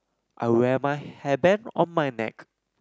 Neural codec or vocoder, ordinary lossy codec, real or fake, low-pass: none; none; real; none